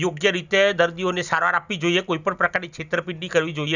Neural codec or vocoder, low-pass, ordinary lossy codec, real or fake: none; 7.2 kHz; none; real